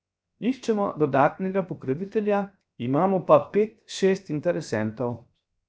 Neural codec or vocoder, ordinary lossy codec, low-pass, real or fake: codec, 16 kHz, 0.7 kbps, FocalCodec; none; none; fake